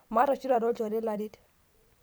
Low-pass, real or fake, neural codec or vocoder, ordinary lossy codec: none; fake; vocoder, 44.1 kHz, 128 mel bands every 256 samples, BigVGAN v2; none